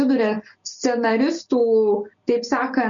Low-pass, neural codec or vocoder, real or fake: 7.2 kHz; none; real